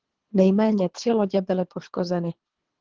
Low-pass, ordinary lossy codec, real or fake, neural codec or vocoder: 7.2 kHz; Opus, 16 kbps; fake; codec, 24 kHz, 3 kbps, HILCodec